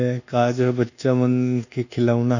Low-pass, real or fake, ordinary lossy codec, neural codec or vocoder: 7.2 kHz; fake; none; codec, 24 kHz, 1.2 kbps, DualCodec